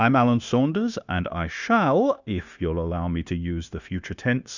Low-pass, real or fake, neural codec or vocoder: 7.2 kHz; fake; codec, 16 kHz, 0.9 kbps, LongCat-Audio-Codec